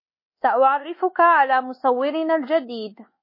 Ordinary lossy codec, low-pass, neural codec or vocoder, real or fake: MP3, 24 kbps; 5.4 kHz; codec, 24 kHz, 1.2 kbps, DualCodec; fake